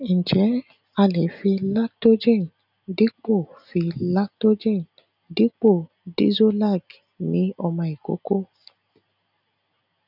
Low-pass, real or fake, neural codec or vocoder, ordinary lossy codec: 5.4 kHz; real; none; none